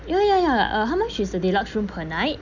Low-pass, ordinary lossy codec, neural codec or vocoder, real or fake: 7.2 kHz; none; none; real